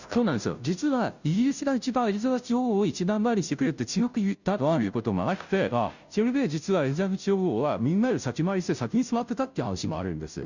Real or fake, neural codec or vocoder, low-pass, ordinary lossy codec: fake; codec, 16 kHz, 0.5 kbps, FunCodec, trained on Chinese and English, 25 frames a second; 7.2 kHz; none